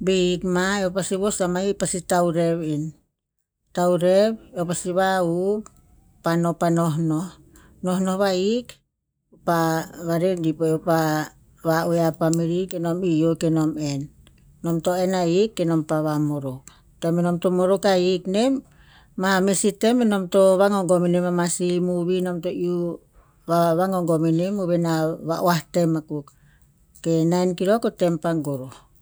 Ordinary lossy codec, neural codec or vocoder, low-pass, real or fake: none; none; none; real